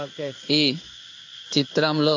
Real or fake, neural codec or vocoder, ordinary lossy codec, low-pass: fake; codec, 16 kHz in and 24 kHz out, 1 kbps, XY-Tokenizer; AAC, 48 kbps; 7.2 kHz